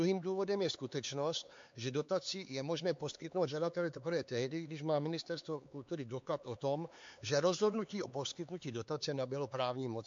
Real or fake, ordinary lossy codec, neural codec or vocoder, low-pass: fake; MP3, 48 kbps; codec, 16 kHz, 4 kbps, X-Codec, HuBERT features, trained on LibriSpeech; 7.2 kHz